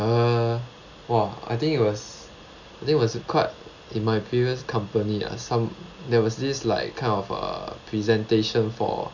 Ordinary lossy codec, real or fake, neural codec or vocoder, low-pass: none; real; none; 7.2 kHz